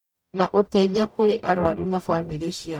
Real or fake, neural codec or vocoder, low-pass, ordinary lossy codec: fake; codec, 44.1 kHz, 0.9 kbps, DAC; 19.8 kHz; MP3, 96 kbps